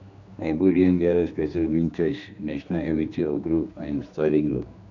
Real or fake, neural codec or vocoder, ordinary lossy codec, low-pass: fake; codec, 16 kHz, 2 kbps, X-Codec, HuBERT features, trained on general audio; none; 7.2 kHz